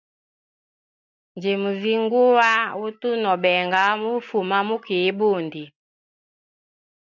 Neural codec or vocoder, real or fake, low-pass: none; real; 7.2 kHz